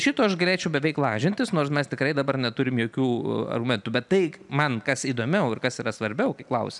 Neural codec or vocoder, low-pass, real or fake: none; 10.8 kHz; real